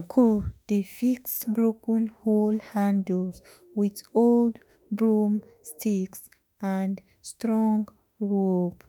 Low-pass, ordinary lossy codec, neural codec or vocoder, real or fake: none; none; autoencoder, 48 kHz, 32 numbers a frame, DAC-VAE, trained on Japanese speech; fake